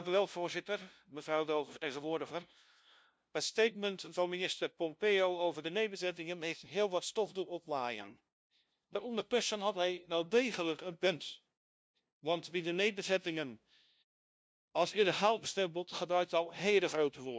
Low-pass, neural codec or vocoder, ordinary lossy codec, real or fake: none; codec, 16 kHz, 0.5 kbps, FunCodec, trained on LibriTTS, 25 frames a second; none; fake